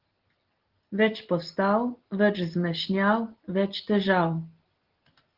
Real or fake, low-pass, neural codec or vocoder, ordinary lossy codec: real; 5.4 kHz; none; Opus, 16 kbps